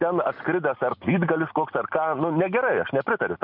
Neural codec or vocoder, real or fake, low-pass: none; real; 5.4 kHz